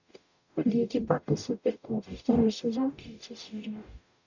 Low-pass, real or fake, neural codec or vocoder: 7.2 kHz; fake; codec, 44.1 kHz, 0.9 kbps, DAC